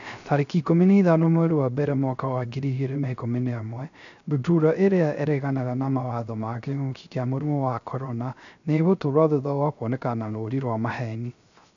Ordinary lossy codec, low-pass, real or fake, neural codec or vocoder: none; 7.2 kHz; fake; codec, 16 kHz, 0.3 kbps, FocalCodec